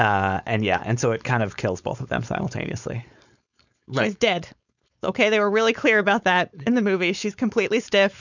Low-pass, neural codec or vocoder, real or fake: 7.2 kHz; codec, 16 kHz, 4.8 kbps, FACodec; fake